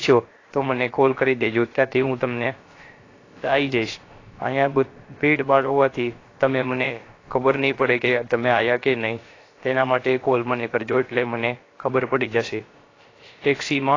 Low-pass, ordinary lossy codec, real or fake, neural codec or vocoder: 7.2 kHz; AAC, 32 kbps; fake; codec, 16 kHz, about 1 kbps, DyCAST, with the encoder's durations